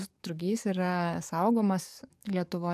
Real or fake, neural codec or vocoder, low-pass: fake; codec, 44.1 kHz, 7.8 kbps, DAC; 14.4 kHz